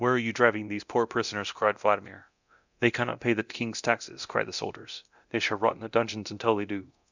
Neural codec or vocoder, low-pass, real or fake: codec, 24 kHz, 0.9 kbps, DualCodec; 7.2 kHz; fake